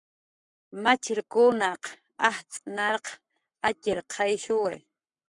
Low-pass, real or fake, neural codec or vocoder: 10.8 kHz; fake; codec, 44.1 kHz, 7.8 kbps, Pupu-Codec